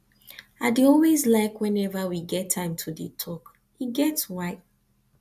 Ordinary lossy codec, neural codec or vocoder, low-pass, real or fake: AAC, 96 kbps; none; 14.4 kHz; real